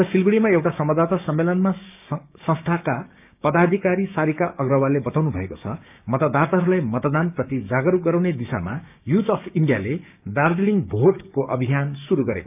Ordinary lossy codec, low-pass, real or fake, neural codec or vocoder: none; 3.6 kHz; fake; codec, 16 kHz, 6 kbps, DAC